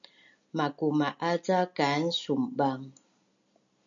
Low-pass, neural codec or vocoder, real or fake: 7.2 kHz; none; real